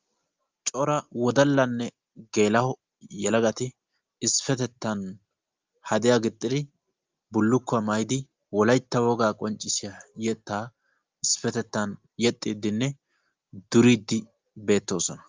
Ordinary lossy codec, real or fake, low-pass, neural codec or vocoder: Opus, 32 kbps; real; 7.2 kHz; none